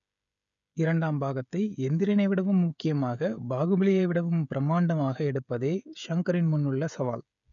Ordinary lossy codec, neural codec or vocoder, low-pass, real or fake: none; codec, 16 kHz, 16 kbps, FreqCodec, smaller model; 7.2 kHz; fake